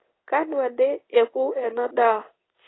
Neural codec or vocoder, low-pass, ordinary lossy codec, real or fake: codec, 16 kHz in and 24 kHz out, 1 kbps, XY-Tokenizer; 7.2 kHz; AAC, 16 kbps; fake